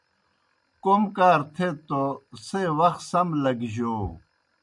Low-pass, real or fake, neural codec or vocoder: 10.8 kHz; real; none